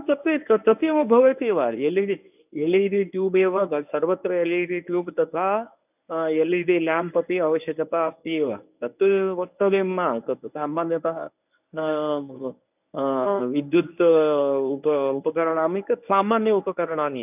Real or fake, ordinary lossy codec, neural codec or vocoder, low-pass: fake; none; codec, 24 kHz, 0.9 kbps, WavTokenizer, medium speech release version 1; 3.6 kHz